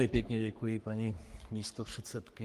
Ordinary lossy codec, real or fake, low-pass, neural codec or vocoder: Opus, 32 kbps; fake; 14.4 kHz; codec, 44.1 kHz, 3.4 kbps, Pupu-Codec